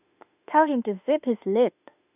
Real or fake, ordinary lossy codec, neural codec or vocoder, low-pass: fake; none; autoencoder, 48 kHz, 32 numbers a frame, DAC-VAE, trained on Japanese speech; 3.6 kHz